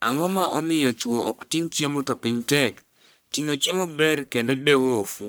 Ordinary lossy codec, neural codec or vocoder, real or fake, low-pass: none; codec, 44.1 kHz, 1.7 kbps, Pupu-Codec; fake; none